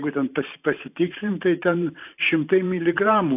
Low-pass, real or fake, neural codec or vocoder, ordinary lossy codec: 3.6 kHz; fake; vocoder, 44.1 kHz, 128 mel bands every 512 samples, BigVGAN v2; AAC, 32 kbps